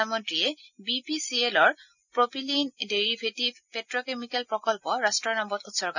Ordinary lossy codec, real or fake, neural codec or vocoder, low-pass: none; real; none; 7.2 kHz